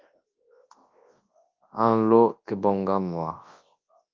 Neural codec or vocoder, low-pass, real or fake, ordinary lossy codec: codec, 24 kHz, 0.9 kbps, WavTokenizer, large speech release; 7.2 kHz; fake; Opus, 32 kbps